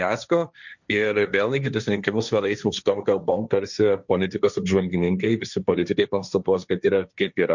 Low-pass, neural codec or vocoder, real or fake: 7.2 kHz; codec, 16 kHz, 1.1 kbps, Voila-Tokenizer; fake